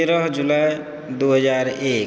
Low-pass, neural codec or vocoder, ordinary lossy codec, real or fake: none; none; none; real